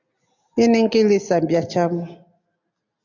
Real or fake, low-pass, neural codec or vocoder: real; 7.2 kHz; none